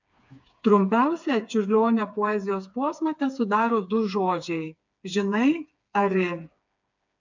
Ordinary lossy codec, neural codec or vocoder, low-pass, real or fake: MP3, 64 kbps; codec, 16 kHz, 4 kbps, FreqCodec, smaller model; 7.2 kHz; fake